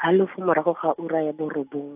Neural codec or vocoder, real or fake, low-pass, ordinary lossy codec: none; real; 3.6 kHz; AAC, 32 kbps